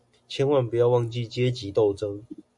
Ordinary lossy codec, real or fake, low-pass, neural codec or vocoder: MP3, 64 kbps; real; 10.8 kHz; none